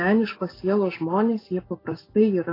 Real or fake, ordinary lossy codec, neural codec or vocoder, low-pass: real; AAC, 24 kbps; none; 5.4 kHz